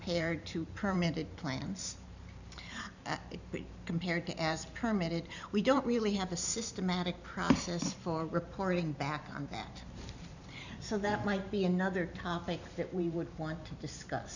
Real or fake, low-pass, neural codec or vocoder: fake; 7.2 kHz; vocoder, 44.1 kHz, 128 mel bands every 512 samples, BigVGAN v2